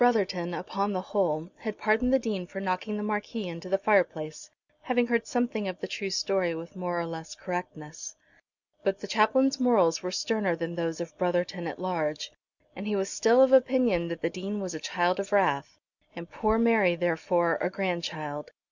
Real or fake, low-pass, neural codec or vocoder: real; 7.2 kHz; none